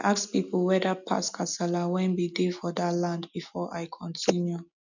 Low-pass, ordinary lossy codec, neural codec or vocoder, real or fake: 7.2 kHz; none; none; real